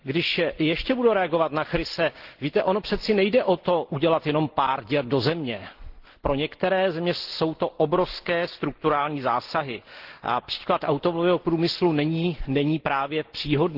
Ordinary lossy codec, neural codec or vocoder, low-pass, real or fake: Opus, 16 kbps; none; 5.4 kHz; real